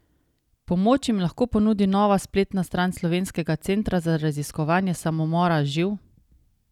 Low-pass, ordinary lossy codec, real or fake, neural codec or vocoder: 19.8 kHz; none; real; none